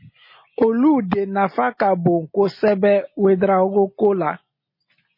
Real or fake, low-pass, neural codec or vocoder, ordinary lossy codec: real; 5.4 kHz; none; MP3, 24 kbps